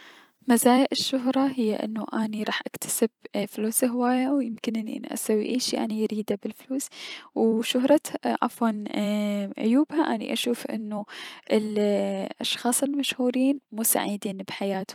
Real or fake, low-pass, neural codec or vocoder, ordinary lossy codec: fake; 19.8 kHz; vocoder, 44.1 kHz, 128 mel bands every 256 samples, BigVGAN v2; none